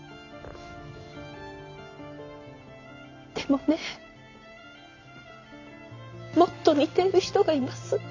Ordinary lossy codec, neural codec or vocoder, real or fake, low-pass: AAC, 48 kbps; none; real; 7.2 kHz